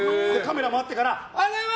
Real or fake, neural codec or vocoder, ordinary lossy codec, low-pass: real; none; none; none